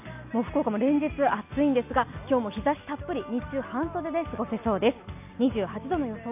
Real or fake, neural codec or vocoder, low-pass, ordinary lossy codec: real; none; 3.6 kHz; AAC, 32 kbps